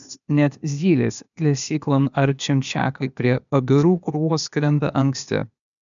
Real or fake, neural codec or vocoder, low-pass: fake; codec, 16 kHz, 0.8 kbps, ZipCodec; 7.2 kHz